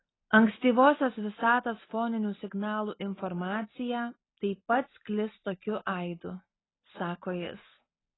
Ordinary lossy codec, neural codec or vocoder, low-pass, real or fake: AAC, 16 kbps; none; 7.2 kHz; real